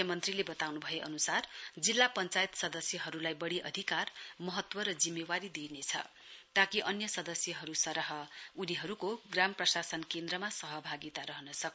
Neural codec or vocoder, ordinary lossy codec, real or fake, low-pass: none; none; real; none